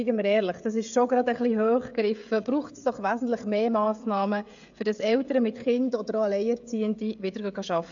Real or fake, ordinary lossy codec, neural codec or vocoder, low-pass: fake; AAC, 64 kbps; codec, 16 kHz, 16 kbps, FreqCodec, smaller model; 7.2 kHz